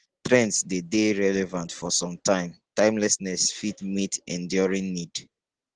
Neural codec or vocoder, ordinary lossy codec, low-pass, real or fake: none; Opus, 16 kbps; 9.9 kHz; real